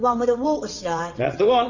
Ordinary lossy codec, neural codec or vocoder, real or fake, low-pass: Opus, 64 kbps; vocoder, 22.05 kHz, 80 mel bands, WaveNeXt; fake; 7.2 kHz